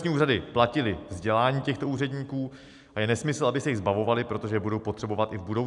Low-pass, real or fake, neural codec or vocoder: 10.8 kHz; real; none